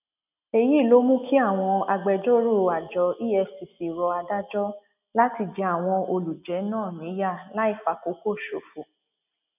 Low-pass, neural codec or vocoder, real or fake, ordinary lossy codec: 3.6 kHz; none; real; none